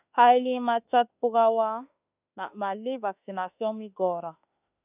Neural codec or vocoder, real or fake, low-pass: autoencoder, 48 kHz, 32 numbers a frame, DAC-VAE, trained on Japanese speech; fake; 3.6 kHz